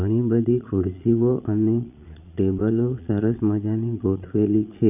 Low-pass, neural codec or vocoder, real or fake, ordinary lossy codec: 3.6 kHz; vocoder, 22.05 kHz, 80 mel bands, Vocos; fake; none